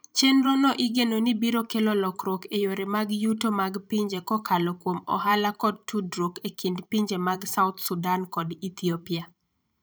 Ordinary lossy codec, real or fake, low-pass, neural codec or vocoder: none; fake; none; vocoder, 44.1 kHz, 128 mel bands every 512 samples, BigVGAN v2